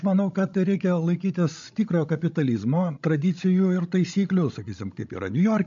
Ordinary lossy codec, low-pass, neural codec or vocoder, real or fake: MP3, 48 kbps; 7.2 kHz; codec, 16 kHz, 16 kbps, FreqCodec, larger model; fake